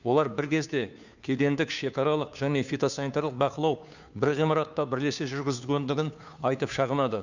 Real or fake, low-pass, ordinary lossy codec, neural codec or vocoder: fake; 7.2 kHz; none; codec, 16 kHz, 2 kbps, FunCodec, trained on Chinese and English, 25 frames a second